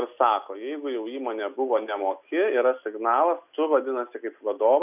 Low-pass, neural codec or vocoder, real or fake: 3.6 kHz; none; real